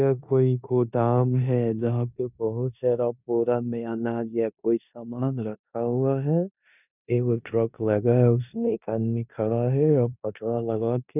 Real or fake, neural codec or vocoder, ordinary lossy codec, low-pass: fake; codec, 16 kHz in and 24 kHz out, 0.9 kbps, LongCat-Audio-Codec, four codebook decoder; none; 3.6 kHz